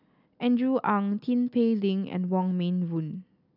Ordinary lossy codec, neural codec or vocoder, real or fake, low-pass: none; none; real; 5.4 kHz